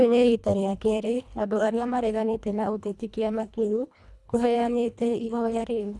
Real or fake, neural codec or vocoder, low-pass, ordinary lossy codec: fake; codec, 24 kHz, 1.5 kbps, HILCodec; none; none